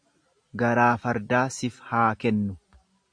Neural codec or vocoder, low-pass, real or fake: none; 9.9 kHz; real